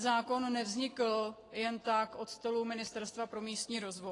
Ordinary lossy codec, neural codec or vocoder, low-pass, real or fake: AAC, 32 kbps; none; 10.8 kHz; real